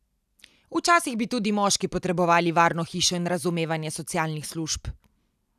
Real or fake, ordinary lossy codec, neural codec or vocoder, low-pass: real; none; none; 14.4 kHz